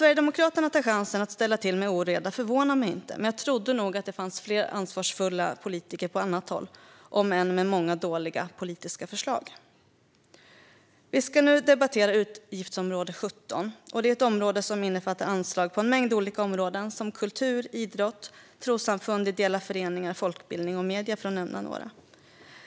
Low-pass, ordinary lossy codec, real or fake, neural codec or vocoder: none; none; real; none